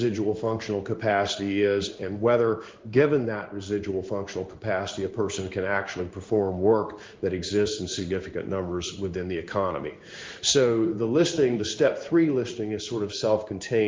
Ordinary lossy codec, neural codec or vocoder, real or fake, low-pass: Opus, 32 kbps; codec, 16 kHz in and 24 kHz out, 1 kbps, XY-Tokenizer; fake; 7.2 kHz